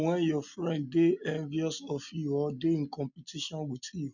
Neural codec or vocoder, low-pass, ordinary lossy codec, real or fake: none; 7.2 kHz; none; real